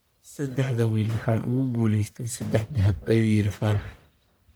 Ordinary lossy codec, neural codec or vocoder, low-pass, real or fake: none; codec, 44.1 kHz, 1.7 kbps, Pupu-Codec; none; fake